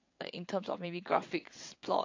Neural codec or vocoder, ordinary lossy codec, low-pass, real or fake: vocoder, 22.05 kHz, 80 mel bands, WaveNeXt; MP3, 48 kbps; 7.2 kHz; fake